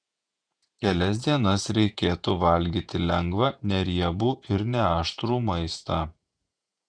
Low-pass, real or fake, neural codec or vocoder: 9.9 kHz; fake; vocoder, 48 kHz, 128 mel bands, Vocos